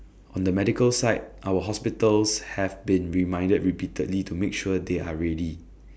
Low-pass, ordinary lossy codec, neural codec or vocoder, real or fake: none; none; none; real